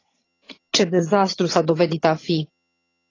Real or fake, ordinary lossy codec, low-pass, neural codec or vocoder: fake; AAC, 32 kbps; 7.2 kHz; vocoder, 22.05 kHz, 80 mel bands, HiFi-GAN